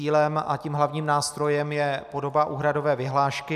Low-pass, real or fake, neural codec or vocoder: 14.4 kHz; real; none